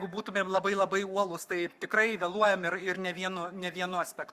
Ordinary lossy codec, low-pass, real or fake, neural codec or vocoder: Opus, 64 kbps; 14.4 kHz; fake; codec, 44.1 kHz, 7.8 kbps, Pupu-Codec